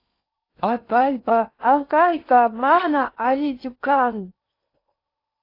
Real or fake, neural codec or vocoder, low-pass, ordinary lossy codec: fake; codec, 16 kHz in and 24 kHz out, 0.6 kbps, FocalCodec, streaming, 4096 codes; 5.4 kHz; AAC, 32 kbps